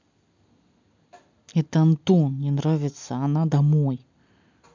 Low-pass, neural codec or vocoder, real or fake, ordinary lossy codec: 7.2 kHz; none; real; MP3, 64 kbps